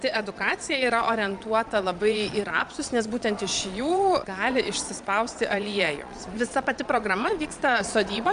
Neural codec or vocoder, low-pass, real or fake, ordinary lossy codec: vocoder, 22.05 kHz, 80 mel bands, Vocos; 9.9 kHz; fake; MP3, 96 kbps